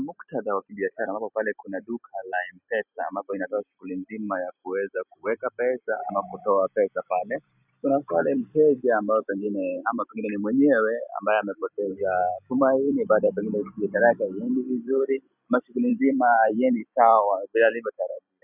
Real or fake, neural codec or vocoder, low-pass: fake; vocoder, 44.1 kHz, 128 mel bands every 256 samples, BigVGAN v2; 3.6 kHz